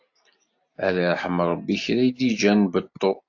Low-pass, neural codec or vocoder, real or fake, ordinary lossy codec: 7.2 kHz; none; real; AAC, 48 kbps